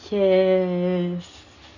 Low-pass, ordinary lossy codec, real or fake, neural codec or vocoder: 7.2 kHz; none; real; none